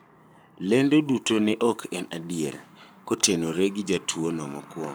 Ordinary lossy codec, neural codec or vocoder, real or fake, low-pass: none; codec, 44.1 kHz, 7.8 kbps, Pupu-Codec; fake; none